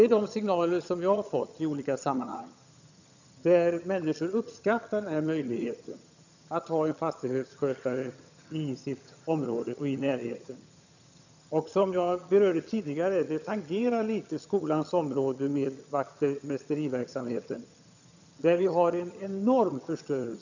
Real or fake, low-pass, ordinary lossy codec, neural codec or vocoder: fake; 7.2 kHz; none; vocoder, 22.05 kHz, 80 mel bands, HiFi-GAN